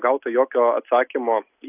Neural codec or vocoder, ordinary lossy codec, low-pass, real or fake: none; AAC, 32 kbps; 3.6 kHz; real